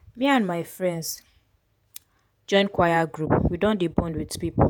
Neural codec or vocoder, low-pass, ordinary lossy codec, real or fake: vocoder, 48 kHz, 128 mel bands, Vocos; none; none; fake